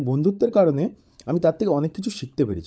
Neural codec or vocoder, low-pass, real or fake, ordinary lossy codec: codec, 16 kHz, 16 kbps, FunCodec, trained on LibriTTS, 50 frames a second; none; fake; none